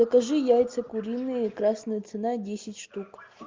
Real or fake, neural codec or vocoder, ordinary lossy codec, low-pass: real; none; Opus, 32 kbps; 7.2 kHz